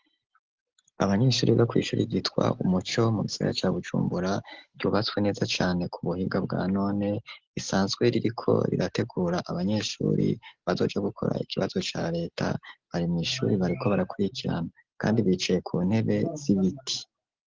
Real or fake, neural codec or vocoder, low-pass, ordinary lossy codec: real; none; 7.2 kHz; Opus, 16 kbps